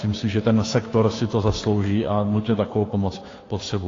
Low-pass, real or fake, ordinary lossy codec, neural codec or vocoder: 7.2 kHz; fake; AAC, 32 kbps; codec, 16 kHz, 2 kbps, FunCodec, trained on Chinese and English, 25 frames a second